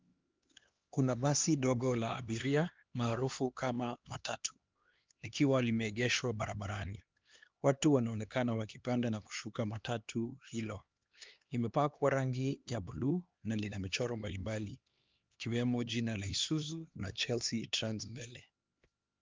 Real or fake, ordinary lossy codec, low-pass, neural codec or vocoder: fake; Opus, 32 kbps; 7.2 kHz; codec, 16 kHz, 2 kbps, X-Codec, HuBERT features, trained on LibriSpeech